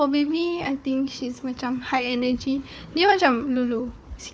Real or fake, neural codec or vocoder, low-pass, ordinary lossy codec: fake; codec, 16 kHz, 4 kbps, FunCodec, trained on Chinese and English, 50 frames a second; none; none